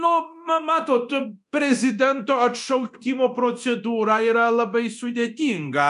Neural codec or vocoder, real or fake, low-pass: codec, 24 kHz, 0.9 kbps, DualCodec; fake; 10.8 kHz